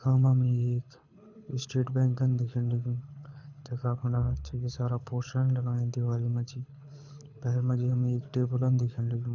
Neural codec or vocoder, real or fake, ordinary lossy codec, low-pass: codec, 24 kHz, 6 kbps, HILCodec; fake; none; 7.2 kHz